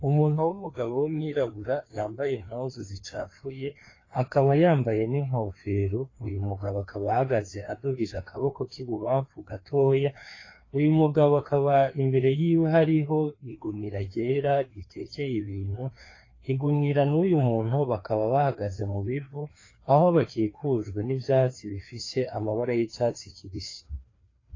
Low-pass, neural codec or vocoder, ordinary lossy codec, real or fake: 7.2 kHz; codec, 16 kHz, 2 kbps, FreqCodec, larger model; AAC, 32 kbps; fake